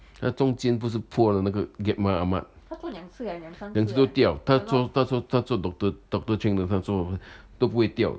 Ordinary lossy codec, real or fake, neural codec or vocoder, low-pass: none; real; none; none